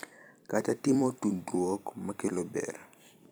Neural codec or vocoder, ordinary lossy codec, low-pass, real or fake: vocoder, 44.1 kHz, 128 mel bands every 256 samples, BigVGAN v2; none; none; fake